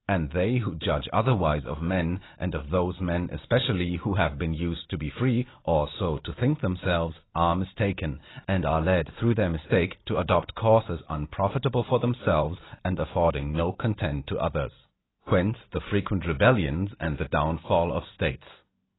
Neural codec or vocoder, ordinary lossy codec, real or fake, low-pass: none; AAC, 16 kbps; real; 7.2 kHz